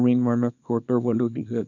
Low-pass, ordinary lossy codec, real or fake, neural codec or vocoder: 7.2 kHz; none; fake; codec, 24 kHz, 0.9 kbps, WavTokenizer, small release